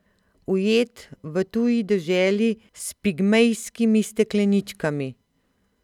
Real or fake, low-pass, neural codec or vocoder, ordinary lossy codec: real; 19.8 kHz; none; none